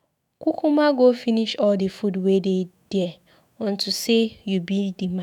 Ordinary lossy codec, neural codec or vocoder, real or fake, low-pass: none; autoencoder, 48 kHz, 128 numbers a frame, DAC-VAE, trained on Japanese speech; fake; 19.8 kHz